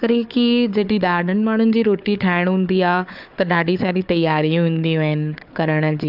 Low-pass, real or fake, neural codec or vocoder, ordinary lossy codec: 5.4 kHz; fake; codec, 16 kHz, 4 kbps, FunCodec, trained on Chinese and English, 50 frames a second; AAC, 48 kbps